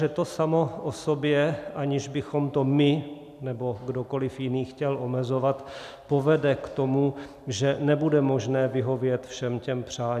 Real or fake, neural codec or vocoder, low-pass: real; none; 14.4 kHz